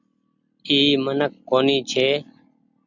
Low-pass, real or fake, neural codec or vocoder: 7.2 kHz; real; none